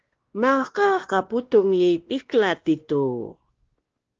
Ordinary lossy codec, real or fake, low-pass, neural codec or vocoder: Opus, 16 kbps; fake; 7.2 kHz; codec, 16 kHz, 1 kbps, X-Codec, WavLM features, trained on Multilingual LibriSpeech